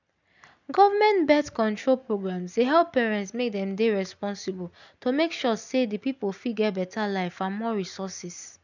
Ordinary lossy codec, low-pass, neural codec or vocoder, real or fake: none; 7.2 kHz; none; real